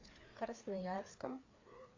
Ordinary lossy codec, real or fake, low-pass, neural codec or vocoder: AAC, 48 kbps; fake; 7.2 kHz; codec, 16 kHz, 4 kbps, FreqCodec, larger model